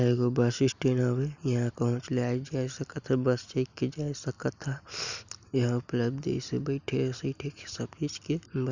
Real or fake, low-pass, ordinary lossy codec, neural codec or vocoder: real; 7.2 kHz; none; none